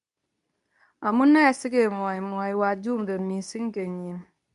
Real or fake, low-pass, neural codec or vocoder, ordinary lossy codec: fake; 10.8 kHz; codec, 24 kHz, 0.9 kbps, WavTokenizer, medium speech release version 2; none